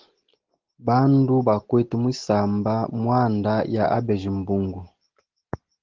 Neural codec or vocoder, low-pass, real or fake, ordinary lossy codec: none; 7.2 kHz; real; Opus, 16 kbps